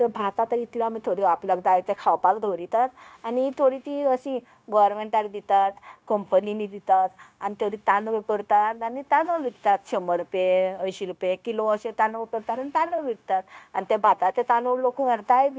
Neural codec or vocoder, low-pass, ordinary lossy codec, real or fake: codec, 16 kHz, 0.9 kbps, LongCat-Audio-Codec; none; none; fake